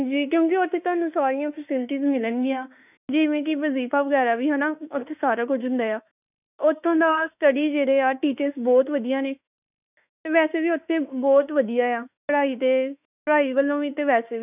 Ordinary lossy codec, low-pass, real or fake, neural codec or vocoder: none; 3.6 kHz; fake; autoencoder, 48 kHz, 32 numbers a frame, DAC-VAE, trained on Japanese speech